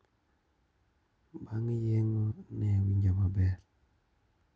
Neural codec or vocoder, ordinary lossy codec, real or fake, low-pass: none; none; real; none